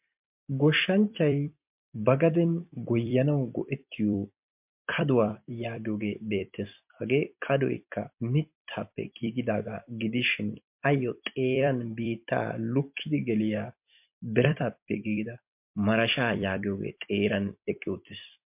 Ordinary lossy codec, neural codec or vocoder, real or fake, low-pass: MP3, 32 kbps; vocoder, 44.1 kHz, 128 mel bands every 256 samples, BigVGAN v2; fake; 3.6 kHz